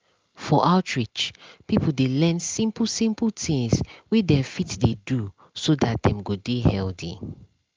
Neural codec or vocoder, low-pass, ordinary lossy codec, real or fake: none; 7.2 kHz; Opus, 24 kbps; real